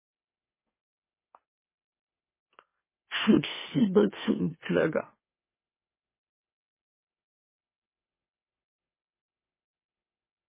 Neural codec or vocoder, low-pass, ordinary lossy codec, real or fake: autoencoder, 44.1 kHz, a latent of 192 numbers a frame, MeloTTS; 3.6 kHz; MP3, 16 kbps; fake